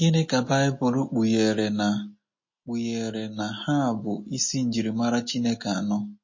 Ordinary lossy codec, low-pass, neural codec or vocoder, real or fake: MP3, 32 kbps; 7.2 kHz; none; real